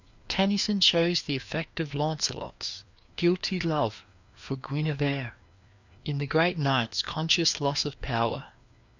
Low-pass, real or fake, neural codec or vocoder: 7.2 kHz; fake; codec, 16 kHz, 2 kbps, FreqCodec, larger model